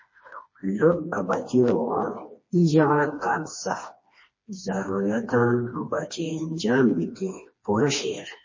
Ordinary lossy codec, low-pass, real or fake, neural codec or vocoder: MP3, 32 kbps; 7.2 kHz; fake; codec, 16 kHz, 2 kbps, FreqCodec, smaller model